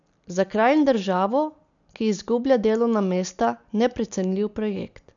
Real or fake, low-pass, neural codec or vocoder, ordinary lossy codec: real; 7.2 kHz; none; none